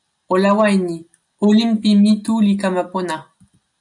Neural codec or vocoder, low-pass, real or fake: none; 10.8 kHz; real